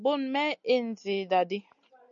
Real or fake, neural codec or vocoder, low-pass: real; none; 7.2 kHz